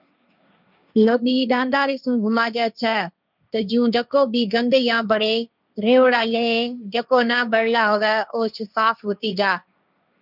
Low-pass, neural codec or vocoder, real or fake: 5.4 kHz; codec, 16 kHz, 1.1 kbps, Voila-Tokenizer; fake